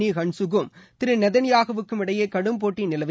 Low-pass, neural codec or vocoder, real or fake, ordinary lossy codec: none; none; real; none